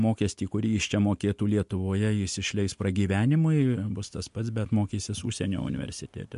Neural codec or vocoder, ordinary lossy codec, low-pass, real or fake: none; MP3, 64 kbps; 10.8 kHz; real